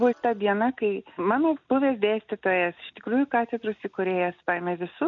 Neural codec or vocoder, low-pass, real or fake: none; 7.2 kHz; real